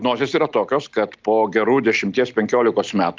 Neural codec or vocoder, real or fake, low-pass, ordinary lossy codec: none; real; 7.2 kHz; Opus, 24 kbps